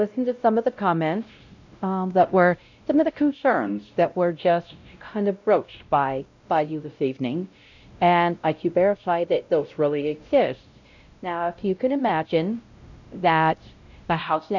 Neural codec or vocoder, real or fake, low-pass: codec, 16 kHz, 0.5 kbps, X-Codec, WavLM features, trained on Multilingual LibriSpeech; fake; 7.2 kHz